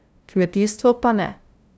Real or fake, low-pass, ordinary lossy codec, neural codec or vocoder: fake; none; none; codec, 16 kHz, 0.5 kbps, FunCodec, trained on LibriTTS, 25 frames a second